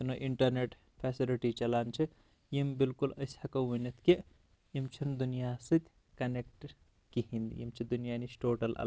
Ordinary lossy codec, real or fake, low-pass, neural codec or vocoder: none; real; none; none